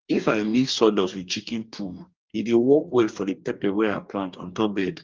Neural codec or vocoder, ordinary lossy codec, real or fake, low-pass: codec, 44.1 kHz, 2.6 kbps, DAC; Opus, 24 kbps; fake; 7.2 kHz